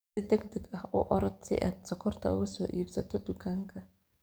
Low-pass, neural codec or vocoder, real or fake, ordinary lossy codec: none; codec, 44.1 kHz, 7.8 kbps, DAC; fake; none